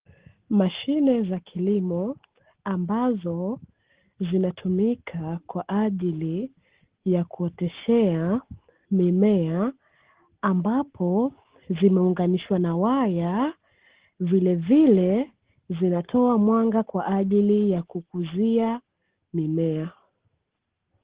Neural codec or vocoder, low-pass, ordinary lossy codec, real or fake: none; 3.6 kHz; Opus, 16 kbps; real